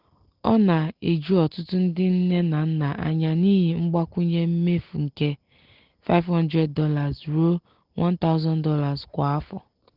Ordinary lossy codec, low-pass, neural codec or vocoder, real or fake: Opus, 16 kbps; 5.4 kHz; none; real